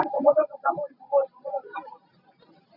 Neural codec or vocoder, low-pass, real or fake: none; 5.4 kHz; real